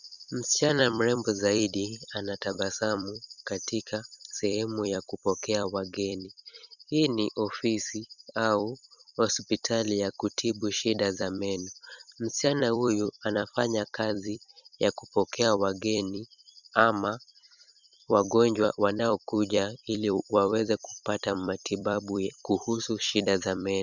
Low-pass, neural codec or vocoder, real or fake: 7.2 kHz; vocoder, 44.1 kHz, 128 mel bands every 256 samples, BigVGAN v2; fake